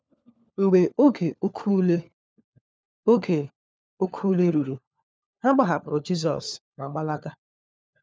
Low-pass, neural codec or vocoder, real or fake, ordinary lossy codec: none; codec, 16 kHz, 4 kbps, FunCodec, trained on LibriTTS, 50 frames a second; fake; none